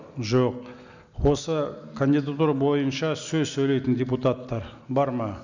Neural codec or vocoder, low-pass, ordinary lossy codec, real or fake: none; 7.2 kHz; none; real